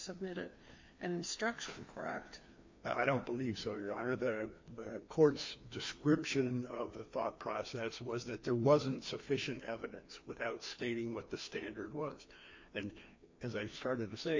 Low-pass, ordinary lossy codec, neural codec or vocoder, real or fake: 7.2 kHz; MP3, 48 kbps; codec, 16 kHz, 2 kbps, FreqCodec, larger model; fake